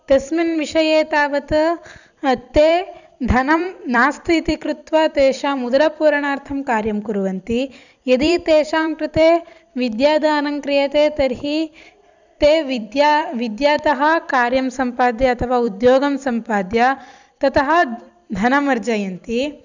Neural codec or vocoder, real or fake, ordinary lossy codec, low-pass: vocoder, 44.1 kHz, 128 mel bands every 256 samples, BigVGAN v2; fake; none; 7.2 kHz